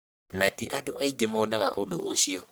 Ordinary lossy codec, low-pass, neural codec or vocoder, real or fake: none; none; codec, 44.1 kHz, 1.7 kbps, Pupu-Codec; fake